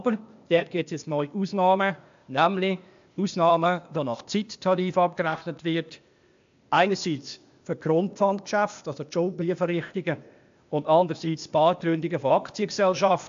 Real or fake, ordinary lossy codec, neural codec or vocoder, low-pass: fake; AAC, 64 kbps; codec, 16 kHz, 0.8 kbps, ZipCodec; 7.2 kHz